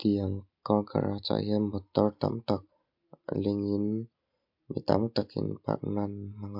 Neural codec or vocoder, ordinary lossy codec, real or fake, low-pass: none; MP3, 48 kbps; real; 5.4 kHz